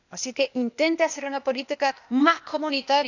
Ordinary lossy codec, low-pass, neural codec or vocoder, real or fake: none; 7.2 kHz; codec, 16 kHz, 0.8 kbps, ZipCodec; fake